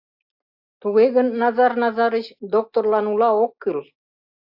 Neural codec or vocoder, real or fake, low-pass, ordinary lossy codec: none; real; 5.4 kHz; AAC, 48 kbps